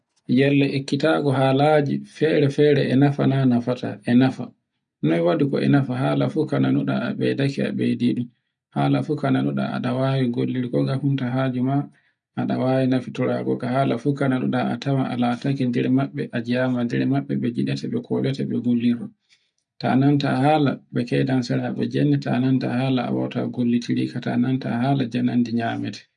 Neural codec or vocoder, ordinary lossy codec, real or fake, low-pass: none; none; real; 9.9 kHz